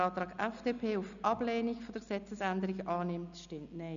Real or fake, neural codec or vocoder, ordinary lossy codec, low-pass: real; none; MP3, 96 kbps; 7.2 kHz